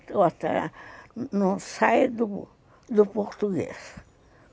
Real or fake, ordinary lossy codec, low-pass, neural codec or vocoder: real; none; none; none